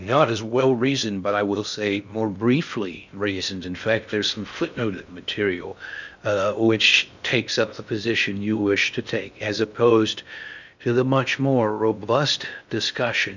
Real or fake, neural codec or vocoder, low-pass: fake; codec, 16 kHz in and 24 kHz out, 0.6 kbps, FocalCodec, streaming, 2048 codes; 7.2 kHz